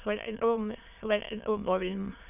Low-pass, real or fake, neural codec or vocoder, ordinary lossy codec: 3.6 kHz; fake; autoencoder, 22.05 kHz, a latent of 192 numbers a frame, VITS, trained on many speakers; none